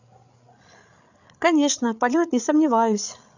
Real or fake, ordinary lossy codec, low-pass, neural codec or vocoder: fake; none; 7.2 kHz; codec, 16 kHz, 8 kbps, FreqCodec, larger model